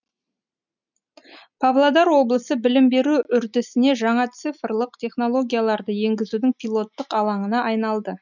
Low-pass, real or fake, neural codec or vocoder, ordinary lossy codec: 7.2 kHz; real; none; none